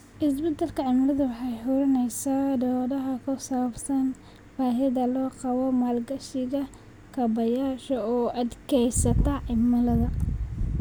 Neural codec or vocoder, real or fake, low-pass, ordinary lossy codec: none; real; none; none